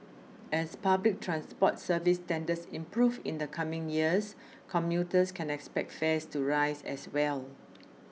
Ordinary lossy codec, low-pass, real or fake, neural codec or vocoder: none; none; real; none